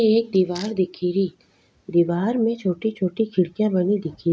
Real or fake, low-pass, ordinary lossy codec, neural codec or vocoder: real; none; none; none